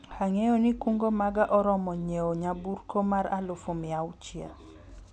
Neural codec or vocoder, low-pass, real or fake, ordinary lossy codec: none; none; real; none